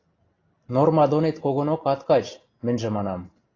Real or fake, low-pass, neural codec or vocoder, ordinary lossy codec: real; 7.2 kHz; none; AAC, 32 kbps